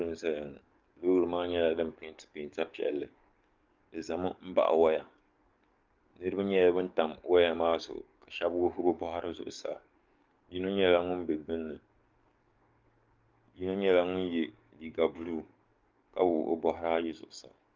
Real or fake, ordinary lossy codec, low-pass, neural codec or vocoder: fake; Opus, 24 kbps; 7.2 kHz; codec, 44.1 kHz, 7.8 kbps, DAC